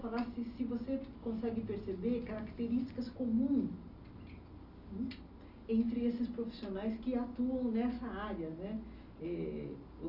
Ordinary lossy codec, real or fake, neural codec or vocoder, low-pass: Opus, 64 kbps; real; none; 5.4 kHz